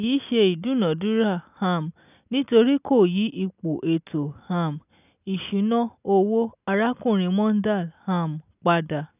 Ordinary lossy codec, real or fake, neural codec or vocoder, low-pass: none; real; none; 3.6 kHz